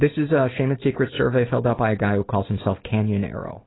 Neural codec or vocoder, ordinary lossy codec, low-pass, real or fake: none; AAC, 16 kbps; 7.2 kHz; real